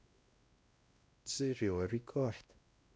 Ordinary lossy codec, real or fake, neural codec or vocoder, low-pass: none; fake; codec, 16 kHz, 1 kbps, X-Codec, WavLM features, trained on Multilingual LibriSpeech; none